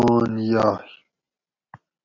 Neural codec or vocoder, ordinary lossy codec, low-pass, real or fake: none; AAC, 48 kbps; 7.2 kHz; real